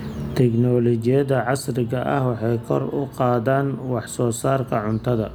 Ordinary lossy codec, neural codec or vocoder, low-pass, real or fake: none; none; none; real